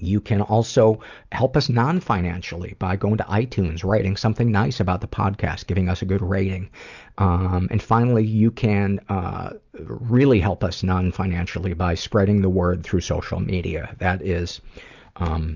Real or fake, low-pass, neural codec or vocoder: real; 7.2 kHz; none